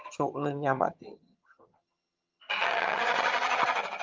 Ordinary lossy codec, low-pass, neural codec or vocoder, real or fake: Opus, 32 kbps; 7.2 kHz; vocoder, 22.05 kHz, 80 mel bands, HiFi-GAN; fake